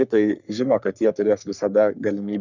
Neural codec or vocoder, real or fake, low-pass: codec, 44.1 kHz, 3.4 kbps, Pupu-Codec; fake; 7.2 kHz